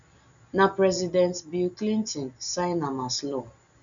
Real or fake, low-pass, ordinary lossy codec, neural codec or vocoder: real; 7.2 kHz; none; none